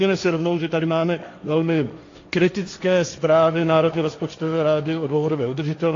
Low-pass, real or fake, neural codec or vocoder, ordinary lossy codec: 7.2 kHz; fake; codec, 16 kHz, 1.1 kbps, Voila-Tokenizer; AAC, 48 kbps